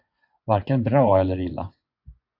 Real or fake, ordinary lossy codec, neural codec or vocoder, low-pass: fake; AAC, 48 kbps; vocoder, 24 kHz, 100 mel bands, Vocos; 5.4 kHz